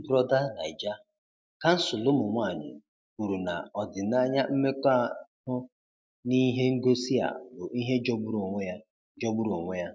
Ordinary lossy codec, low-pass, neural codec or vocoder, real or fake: none; none; none; real